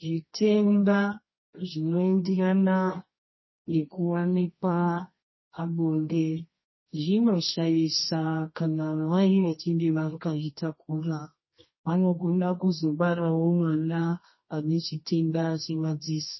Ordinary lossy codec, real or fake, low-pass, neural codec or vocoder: MP3, 24 kbps; fake; 7.2 kHz; codec, 24 kHz, 0.9 kbps, WavTokenizer, medium music audio release